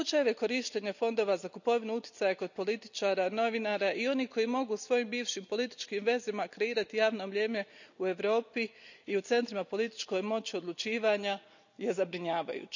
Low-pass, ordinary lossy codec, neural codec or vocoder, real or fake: 7.2 kHz; none; none; real